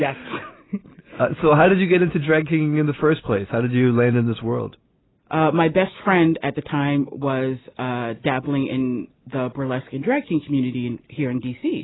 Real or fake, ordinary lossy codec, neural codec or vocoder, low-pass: real; AAC, 16 kbps; none; 7.2 kHz